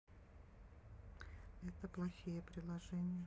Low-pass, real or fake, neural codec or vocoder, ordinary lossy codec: none; real; none; none